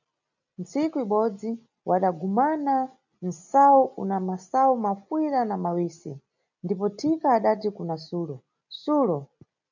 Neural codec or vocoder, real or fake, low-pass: none; real; 7.2 kHz